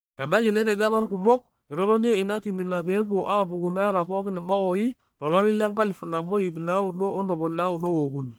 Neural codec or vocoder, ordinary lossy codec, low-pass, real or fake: codec, 44.1 kHz, 1.7 kbps, Pupu-Codec; none; none; fake